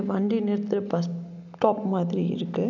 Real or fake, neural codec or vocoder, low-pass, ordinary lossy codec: real; none; 7.2 kHz; none